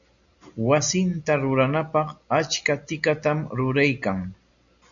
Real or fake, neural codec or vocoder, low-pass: real; none; 7.2 kHz